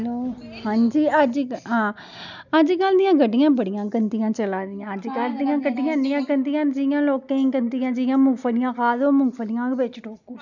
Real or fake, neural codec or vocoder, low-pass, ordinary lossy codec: real; none; 7.2 kHz; none